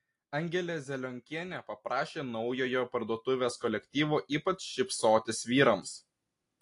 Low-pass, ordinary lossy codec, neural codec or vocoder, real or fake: 10.8 kHz; AAC, 48 kbps; none; real